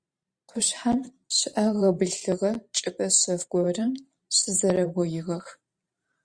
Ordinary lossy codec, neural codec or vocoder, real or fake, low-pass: Opus, 64 kbps; vocoder, 44.1 kHz, 128 mel bands every 512 samples, BigVGAN v2; fake; 9.9 kHz